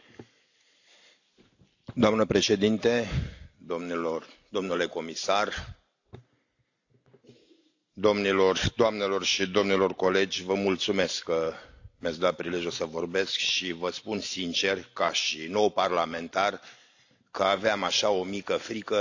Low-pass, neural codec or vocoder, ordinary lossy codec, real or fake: 7.2 kHz; none; AAC, 48 kbps; real